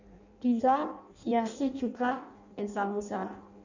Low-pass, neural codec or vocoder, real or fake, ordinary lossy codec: 7.2 kHz; codec, 16 kHz in and 24 kHz out, 0.6 kbps, FireRedTTS-2 codec; fake; none